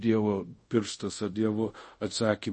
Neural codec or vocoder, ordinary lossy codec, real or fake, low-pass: codec, 24 kHz, 0.5 kbps, DualCodec; MP3, 32 kbps; fake; 10.8 kHz